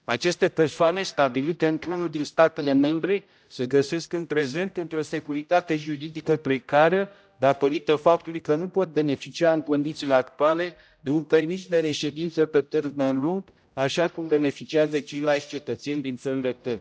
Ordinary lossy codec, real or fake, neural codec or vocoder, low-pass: none; fake; codec, 16 kHz, 0.5 kbps, X-Codec, HuBERT features, trained on general audio; none